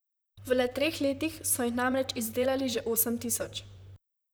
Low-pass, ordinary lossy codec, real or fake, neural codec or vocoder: none; none; fake; vocoder, 44.1 kHz, 128 mel bands, Pupu-Vocoder